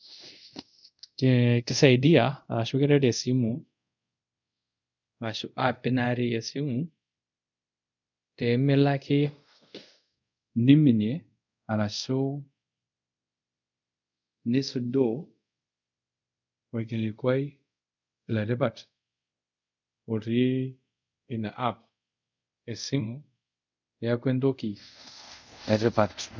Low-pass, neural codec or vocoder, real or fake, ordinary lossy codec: 7.2 kHz; codec, 24 kHz, 0.5 kbps, DualCodec; fake; none